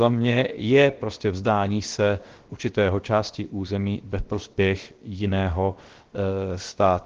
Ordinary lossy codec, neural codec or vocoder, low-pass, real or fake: Opus, 16 kbps; codec, 16 kHz, 0.7 kbps, FocalCodec; 7.2 kHz; fake